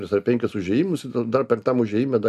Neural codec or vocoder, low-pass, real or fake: none; 14.4 kHz; real